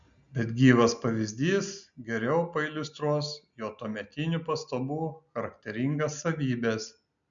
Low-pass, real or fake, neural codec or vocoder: 7.2 kHz; real; none